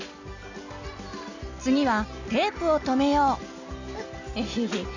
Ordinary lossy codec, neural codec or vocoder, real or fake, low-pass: none; none; real; 7.2 kHz